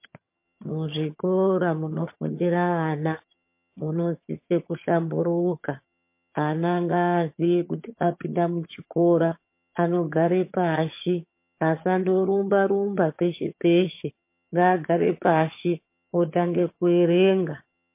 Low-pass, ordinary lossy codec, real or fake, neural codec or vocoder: 3.6 kHz; MP3, 24 kbps; fake; vocoder, 22.05 kHz, 80 mel bands, HiFi-GAN